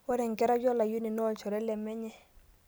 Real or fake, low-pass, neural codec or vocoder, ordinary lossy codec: real; none; none; none